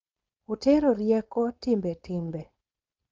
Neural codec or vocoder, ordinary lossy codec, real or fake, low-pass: codec, 16 kHz, 4.8 kbps, FACodec; Opus, 24 kbps; fake; 7.2 kHz